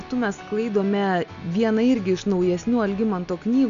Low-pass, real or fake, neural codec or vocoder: 7.2 kHz; real; none